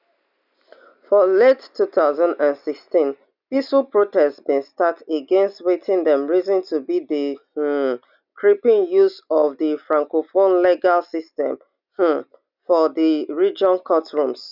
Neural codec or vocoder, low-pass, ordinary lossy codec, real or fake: none; 5.4 kHz; none; real